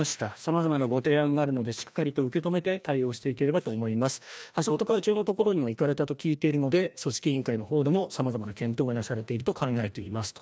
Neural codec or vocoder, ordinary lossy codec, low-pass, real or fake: codec, 16 kHz, 1 kbps, FreqCodec, larger model; none; none; fake